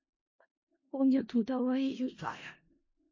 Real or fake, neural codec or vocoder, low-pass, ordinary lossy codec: fake; codec, 16 kHz in and 24 kHz out, 0.4 kbps, LongCat-Audio-Codec, four codebook decoder; 7.2 kHz; MP3, 32 kbps